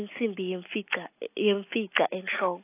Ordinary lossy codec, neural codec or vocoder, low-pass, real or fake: AAC, 24 kbps; none; 3.6 kHz; real